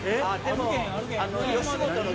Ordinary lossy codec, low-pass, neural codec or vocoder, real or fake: none; none; none; real